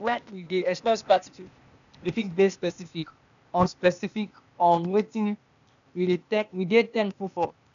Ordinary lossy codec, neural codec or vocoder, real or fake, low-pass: none; codec, 16 kHz, 0.8 kbps, ZipCodec; fake; 7.2 kHz